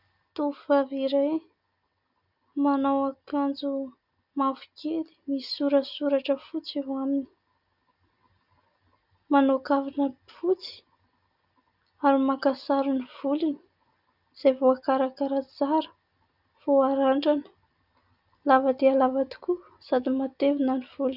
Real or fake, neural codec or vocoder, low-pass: real; none; 5.4 kHz